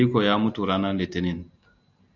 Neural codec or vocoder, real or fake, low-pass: none; real; 7.2 kHz